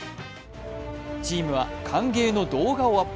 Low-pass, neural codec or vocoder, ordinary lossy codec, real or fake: none; none; none; real